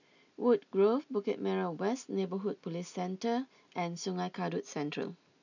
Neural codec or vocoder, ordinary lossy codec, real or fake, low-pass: none; none; real; 7.2 kHz